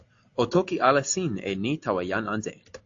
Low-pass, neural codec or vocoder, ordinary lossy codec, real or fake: 7.2 kHz; none; AAC, 48 kbps; real